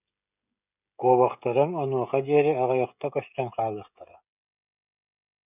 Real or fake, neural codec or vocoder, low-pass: fake; codec, 16 kHz, 16 kbps, FreqCodec, smaller model; 3.6 kHz